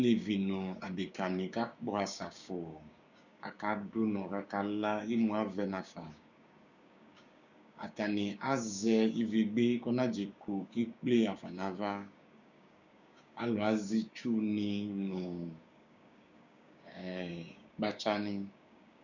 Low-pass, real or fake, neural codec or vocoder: 7.2 kHz; fake; codec, 16 kHz, 6 kbps, DAC